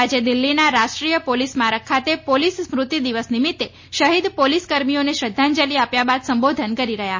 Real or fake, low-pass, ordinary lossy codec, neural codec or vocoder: real; 7.2 kHz; MP3, 32 kbps; none